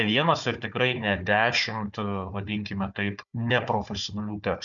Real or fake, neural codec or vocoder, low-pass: fake; codec, 16 kHz, 4 kbps, FunCodec, trained on Chinese and English, 50 frames a second; 7.2 kHz